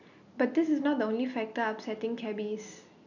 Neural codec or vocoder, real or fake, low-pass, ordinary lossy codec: none; real; 7.2 kHz; none